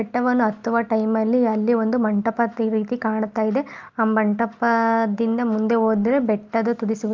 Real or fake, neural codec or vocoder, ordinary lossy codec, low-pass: real; none; Opus, 24 kbps; 7.2 kHz